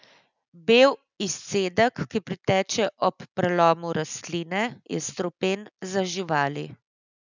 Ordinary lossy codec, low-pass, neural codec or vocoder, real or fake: none; 7.2 kHz; none; real